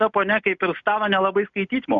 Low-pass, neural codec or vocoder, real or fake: 7.2 kHz; none; real